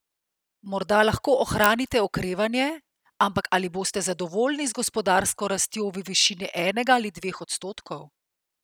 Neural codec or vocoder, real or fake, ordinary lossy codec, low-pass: none; real; none; none